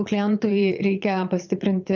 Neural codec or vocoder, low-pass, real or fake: vocoder, 22.05 kHz, 80 mel bands, Vocos; 7.2 kHz; fake